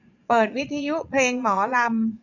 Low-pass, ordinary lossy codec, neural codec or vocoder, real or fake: 7.2 kHz; none; vocoder, 22.05 kHz, 80 mel bands, Vocos; fake